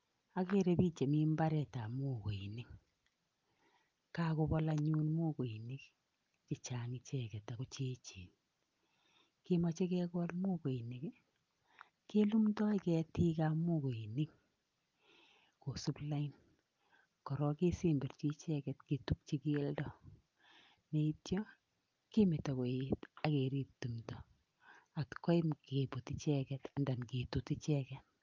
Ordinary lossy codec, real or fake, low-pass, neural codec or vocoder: Opus, 24 kbps; real; 7.2 kHz; none